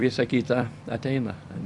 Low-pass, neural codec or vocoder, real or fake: 10.8 kHz; vocoder, 44.1 kHz, 128 mel bands every 256 samples, BigVGAN v2; fake